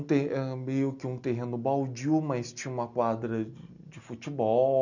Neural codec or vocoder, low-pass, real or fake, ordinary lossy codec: none; 7.2 kHz; real; none